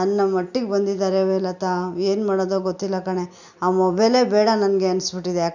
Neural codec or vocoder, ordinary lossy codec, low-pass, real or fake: none; none; 7.2 kHz; real